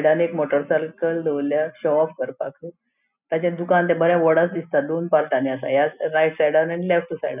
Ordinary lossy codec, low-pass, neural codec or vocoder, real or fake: none; 3.6 kHz; none; real